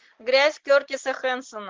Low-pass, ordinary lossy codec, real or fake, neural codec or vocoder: 7.2 kHz; Opus, 16 kbps; real; none